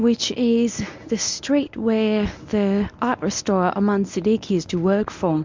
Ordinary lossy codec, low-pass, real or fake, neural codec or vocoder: MP3, 64 kbps; 7.2 kHz; fake; codec, 24 kHz, 0.9 kbps, WavTokenizer, medium speech release version 1